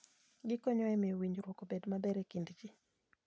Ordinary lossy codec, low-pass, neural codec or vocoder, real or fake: none; none; none; real